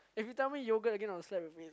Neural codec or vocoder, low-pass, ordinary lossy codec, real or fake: none; none; none; real